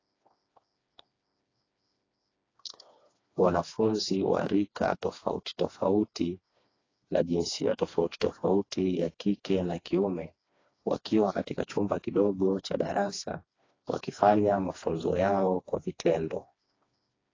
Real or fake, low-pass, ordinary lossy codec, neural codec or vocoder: fake; 7.2 kHz; AAC, 32 kbps; codec, 16 kHz, 2 kbps, FreqCodec, smaller model